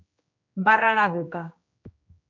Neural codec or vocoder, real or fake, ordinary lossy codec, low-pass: codec, 16 kHz, 1 kbps, X-Codec, HuBERT features, trained on general audio; fake; MP3, 48 kbps; 7.2 kHz